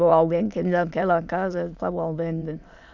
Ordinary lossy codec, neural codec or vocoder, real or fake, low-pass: none; autoencoder, 22.05 kHz, a latent of 192 numbers a frame, VITS, trained on many speakers; fake; 7.2 kHz